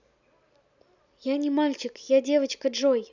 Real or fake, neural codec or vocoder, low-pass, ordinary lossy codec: real; none; 7.2 kHz; none